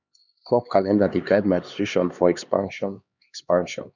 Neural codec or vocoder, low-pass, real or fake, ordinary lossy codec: codec, 16 kHz, 2 kbps, X-Codec, HuBERT features, trained on LibriSpeech; 7.2 kHz; fake; none